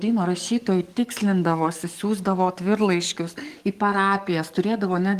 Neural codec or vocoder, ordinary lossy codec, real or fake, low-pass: codec, 44.1 kHz, 7.8 kbps, DAC; Opus, 24 kbps; fake; 14.4 kHz